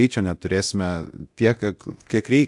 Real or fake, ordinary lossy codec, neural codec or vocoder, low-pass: fake; AAC, 64 kbps; codec, 24 kHz, 0.9 kbps, DualCodec; 10.8 kHz